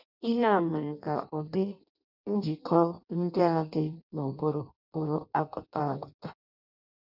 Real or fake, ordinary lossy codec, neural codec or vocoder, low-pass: fake; none; codec, 16 kHz in and 24 kHz out, 0.6 kbps, FireRedTTS-2 codec; 5.4 kHz